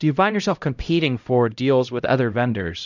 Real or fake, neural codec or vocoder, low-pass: fake; codec, 16 kHz, 0.5 kbps, X-Codec, HuBERT features, trained on LibriSpeech; 7.2 kHz